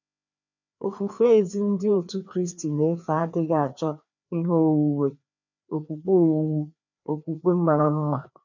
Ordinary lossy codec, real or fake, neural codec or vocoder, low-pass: none; fake; codec, 16 kHz, 2 kbps, FreqCodec, larger model; 7.2 kHz